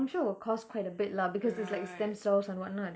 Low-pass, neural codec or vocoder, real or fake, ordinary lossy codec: none; none; real; none